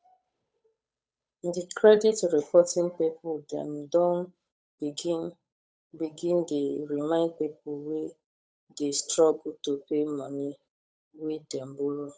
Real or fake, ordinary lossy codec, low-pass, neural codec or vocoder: fake; none; none; codec, 16 kHz, 8 kbps, FunCodec, trained on Chinese and English, 25 frames a second